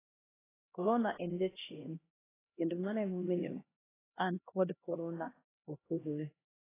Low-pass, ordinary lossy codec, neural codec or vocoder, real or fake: 3.6 kHz; AAC, 16 kbps; codec, 16 kHz, 1 kbps, X-Codec, HuBERT features, trained on LibriSpeech; fake